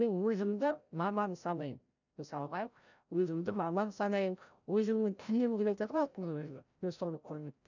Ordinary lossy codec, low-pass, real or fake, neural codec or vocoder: none; 7.2 kHz; fake; codec, 16 kHz, 0.5 kbps, FreqCodec, larger model